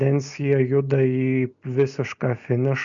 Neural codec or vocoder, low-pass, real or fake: none; 7.2 kHz; real